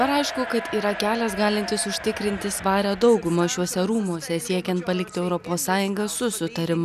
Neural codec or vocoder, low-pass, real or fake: none; 14.4 kHz; real